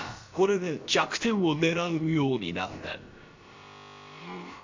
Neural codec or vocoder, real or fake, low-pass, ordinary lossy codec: codec, 16 kHz, about 1 kbps, DyCAST, with the encoder's durations; fake; 7.2 kHz; MP3, 48 kbps